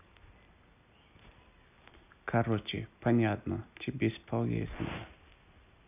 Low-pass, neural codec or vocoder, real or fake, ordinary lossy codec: 3.6 kHz; none; real; none